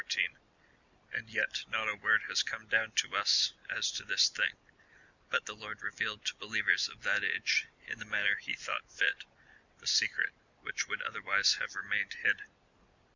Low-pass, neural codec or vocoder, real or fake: 7.2 kHz; none; real